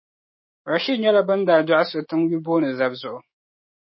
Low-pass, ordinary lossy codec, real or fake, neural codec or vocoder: 7.2 kHz; MP3, 24 kbps; fake; autoencoder, 48 kHz, 128 numbers a frame, DAC-VAE, trained on Japanese speech